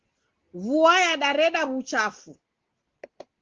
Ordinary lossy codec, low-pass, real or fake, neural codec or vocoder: Opus, 16 kbps; 7.2 kHz; real; none